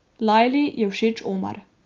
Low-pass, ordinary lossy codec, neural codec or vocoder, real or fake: 7.2 kHz; Opus, 32 kbps; none; real